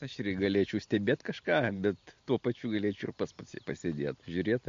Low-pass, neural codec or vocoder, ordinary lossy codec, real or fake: 7.2 kHz; none; MP3, 48 kbps; real